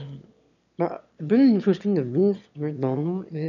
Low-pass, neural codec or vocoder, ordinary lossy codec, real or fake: 7.2 kHz; autoencoder, 22.05 kHz, a latent of 192 numbers a frame, VITS, trained on one speaker; none; fake